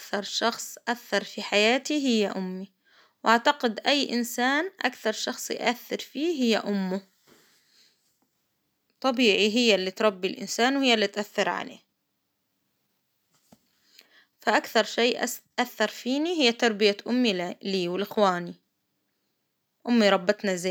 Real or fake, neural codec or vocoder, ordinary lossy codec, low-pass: real; none; none; none